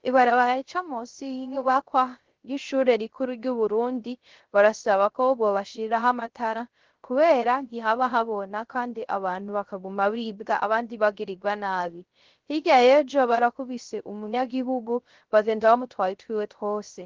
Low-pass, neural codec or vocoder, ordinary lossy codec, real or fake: 7.2 kHz; codec, 16 kHz, 0.3 kbps, FocalCodec; Opus, 16 kbps; fake